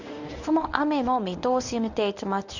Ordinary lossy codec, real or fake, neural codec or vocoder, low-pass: none; fake; codec, 24 kHz, 0.9 kbps, WavTokenizer, medium speech release version 1; 7.2 kHz